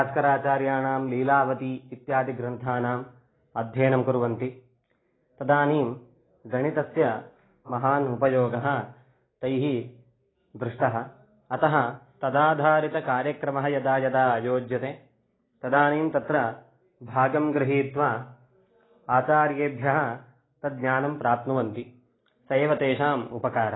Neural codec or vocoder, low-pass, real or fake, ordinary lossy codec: none; 7.2 kHz; real; AAC, 16 kbps